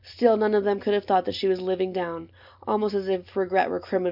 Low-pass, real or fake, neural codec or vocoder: 5.4 kHz; real; none